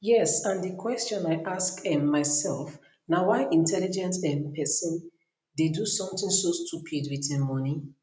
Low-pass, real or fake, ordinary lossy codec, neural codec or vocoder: none; real; none; none